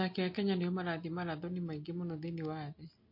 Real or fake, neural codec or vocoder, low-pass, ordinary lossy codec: real; none; 5.4 kHz; MP3, 32 kbps